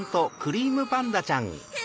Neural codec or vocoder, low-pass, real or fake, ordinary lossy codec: none; none; real; none